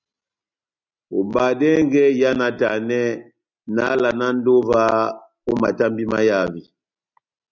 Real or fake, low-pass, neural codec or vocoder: real; 7.2 kHz; none